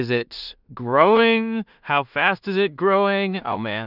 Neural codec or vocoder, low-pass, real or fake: codec, 16 kHz in and 24 kHz out, 0.4 kbps, LongCat-Audio-Codec, two codebook decoder; 5.4 kHz; fake